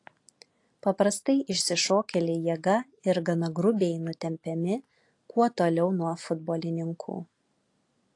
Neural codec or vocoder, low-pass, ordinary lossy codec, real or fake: none; 10.8 kHz; AAC, 48 kbps; real